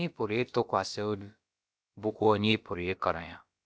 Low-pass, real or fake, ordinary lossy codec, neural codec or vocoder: none; fake; none; codec, 16 kHz, about 1 kbps, DyCAST, with the encoder's durations